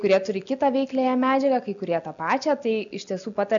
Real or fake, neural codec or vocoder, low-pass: real; none; 7.2 kHz